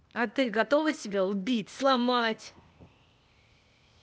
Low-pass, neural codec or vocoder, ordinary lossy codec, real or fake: none; codec, 16 kHz, 0.8 kbps, ZipCodec; none; fake